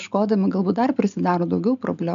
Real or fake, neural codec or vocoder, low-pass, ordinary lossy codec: real; none; 7.2 kHz; AAC, 96 kbps